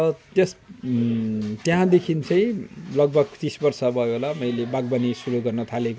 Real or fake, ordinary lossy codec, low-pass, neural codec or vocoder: real; none; none; none